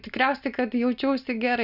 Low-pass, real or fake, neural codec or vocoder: 5.4 kHz; real; none